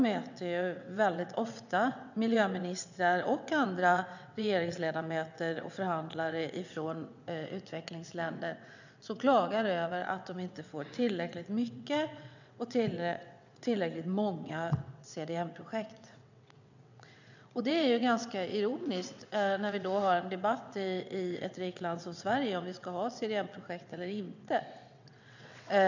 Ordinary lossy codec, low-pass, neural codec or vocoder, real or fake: none; 7.2 kHz; vocoder, 22.05 kHz, 80 mel bands, WaveNeXt; fake